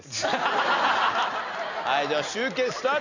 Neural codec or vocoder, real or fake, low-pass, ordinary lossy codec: none; real; 7.2 kHz; none